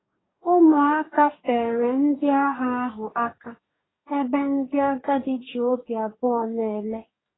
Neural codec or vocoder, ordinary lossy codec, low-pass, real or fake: codec, 44.1 kHz, 2.6 kbps, DAC; AAC, 16 kbps; 7.2 kHz; fake